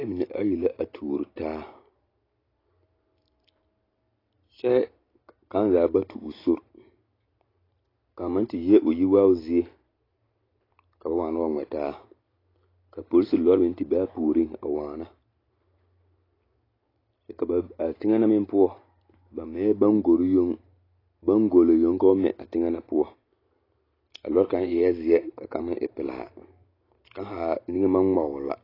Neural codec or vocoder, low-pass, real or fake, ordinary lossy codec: none; 5.4 kHz; real; AAC, 24 kbps